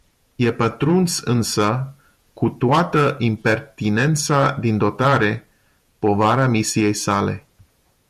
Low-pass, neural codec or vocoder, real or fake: 14.4 kHz; vocoder, 48 kHz, 128 mel bands, Vocos; fake